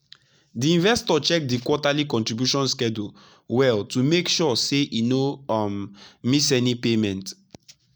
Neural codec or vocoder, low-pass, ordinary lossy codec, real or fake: none; none; none; real